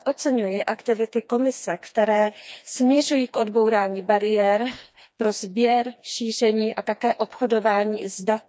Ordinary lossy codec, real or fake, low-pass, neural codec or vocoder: none; fake; none; codec, 16 kHz, 2 kbps, FreqCodec, smaller model